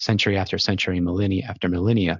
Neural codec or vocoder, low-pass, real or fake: none; 7.2 kHz; real